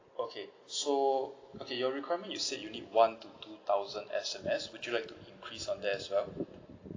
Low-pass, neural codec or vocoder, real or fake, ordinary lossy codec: 7.2 kHz; none; real; AAC, 32 kbps